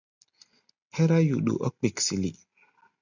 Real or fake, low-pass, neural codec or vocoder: real; 7.2 kHz; none